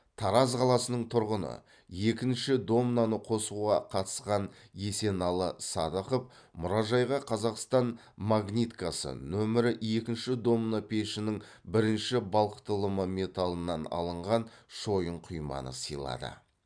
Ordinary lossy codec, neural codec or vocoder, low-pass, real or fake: none; none; 9.9 kHz; real